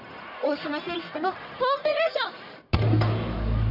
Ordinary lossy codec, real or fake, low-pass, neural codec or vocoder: none; fake; 5.4 kHz; codec, 44.1 kHz, 1.7 kbps, Pupu-Codec